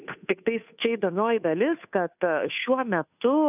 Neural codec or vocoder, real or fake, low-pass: codec, 16 kHz, 2 kbps, FunCodec, trained on Chinese and English, 25 frames a second; fake; 3.6 kHz